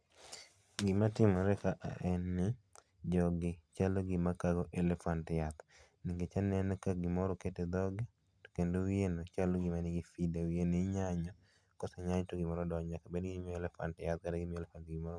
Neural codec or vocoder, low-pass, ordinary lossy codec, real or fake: none; none; none; real